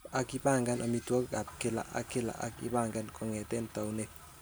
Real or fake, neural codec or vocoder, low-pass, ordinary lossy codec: real; none; none; none